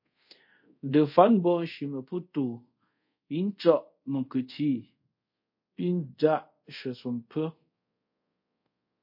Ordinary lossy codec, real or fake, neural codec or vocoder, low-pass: MP3, 32 kbps; fake; codec, 24 kHz, 0.5 kbps, DualCodec; 5.4 kHz